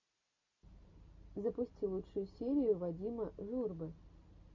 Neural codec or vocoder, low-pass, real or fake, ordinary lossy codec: none; 7.2 kHz; real; MP3, 48 kbps